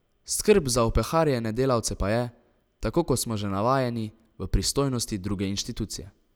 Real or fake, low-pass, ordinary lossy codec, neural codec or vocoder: real; none; none; none